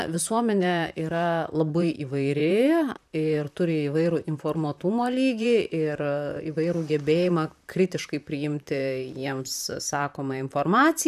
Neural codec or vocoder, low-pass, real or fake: vocoder, 44.1 kHz, 128 mel bands, Pupu-Vocoder; 14.4 kHz; fake